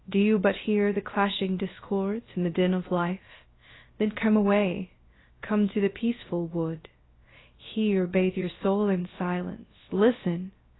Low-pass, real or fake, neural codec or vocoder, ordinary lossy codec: 7.2 kHz; fake; codec, 16 kHz, 0.3 kbps, FocalCodec; AAC, 16 kbps